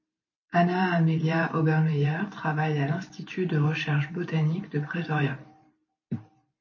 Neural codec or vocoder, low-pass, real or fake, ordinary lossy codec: vocoder, 44.1 kHz, 128 mel bands every 512 samples, BigVGAN v2; 7.2 kHz; fake; MP3, 32 kbps